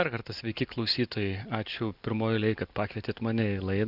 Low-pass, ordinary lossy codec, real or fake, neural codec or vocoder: 5.4 kHz; Opus, 64 kbps; real; none